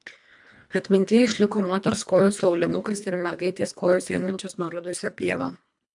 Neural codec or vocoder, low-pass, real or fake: codec, 24 kHz, 1.5 kbps, HILCodec; 10.8 kHz; fake